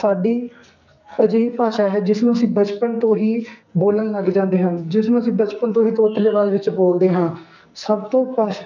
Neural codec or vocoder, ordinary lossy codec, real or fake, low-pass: codec, 44.1 kHz, 2.6 kbps, SNAC; none; fake; 7.2 kHz